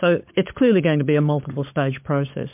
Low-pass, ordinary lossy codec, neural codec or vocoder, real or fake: 3.6 kHz; MP3, 32 kbps; codec, 16 kHz, 16 kbps, FunCodec, trained on LibriTTS, 50 frames a second; fake